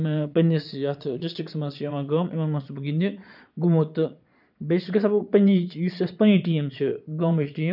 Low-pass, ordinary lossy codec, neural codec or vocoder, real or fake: 5.4 kHz; none; vocoder, 22.05 kHz, 80 mel bands, Vocos; fake